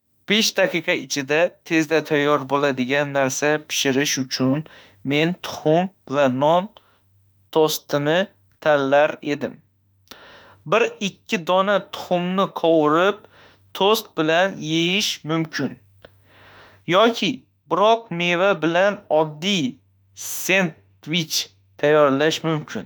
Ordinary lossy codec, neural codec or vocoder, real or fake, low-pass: none; autoencoder, 48 kHz, 32 numbers a frame, DAC-VAE, trained on Japanese speech; fake; none